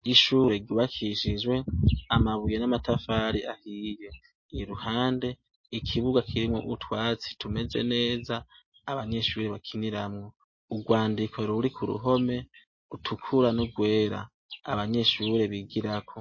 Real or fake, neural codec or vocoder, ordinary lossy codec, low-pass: real; none; MP3, 32 kbps; 7.2 kHz